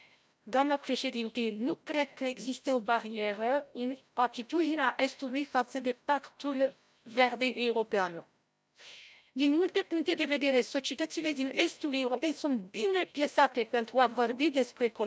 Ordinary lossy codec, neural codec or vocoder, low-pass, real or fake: none; codec, 16 kHz, 0.5 kbps, FreqCodec, larger model; none; fake